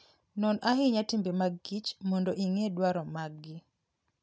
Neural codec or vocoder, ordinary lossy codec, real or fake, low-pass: none; none; real; none